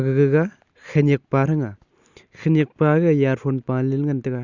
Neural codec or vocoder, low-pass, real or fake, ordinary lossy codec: none; 7.2 kHz; real; Opus, 64 kbps